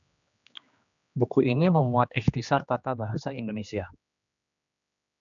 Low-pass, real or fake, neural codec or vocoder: 7.2 kHz; fake; codec, 16 kHz, 2 kbps, X-Codec, HuBERT features, trained on general audio